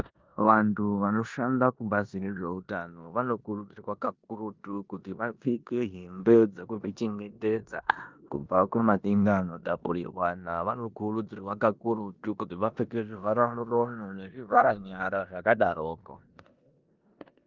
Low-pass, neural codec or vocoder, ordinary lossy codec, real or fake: 7.2 kHz; codec, 16 kHz in and 24 kHz out, 0.9 kbps, LongCat-Audio-Codec, four codebook decoder; Opus, 24 kbps; fake